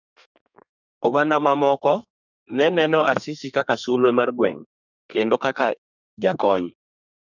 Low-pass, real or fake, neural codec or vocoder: 7.2 kHz; fake; codec, 32 kHz, 1.9 kbps, SNAC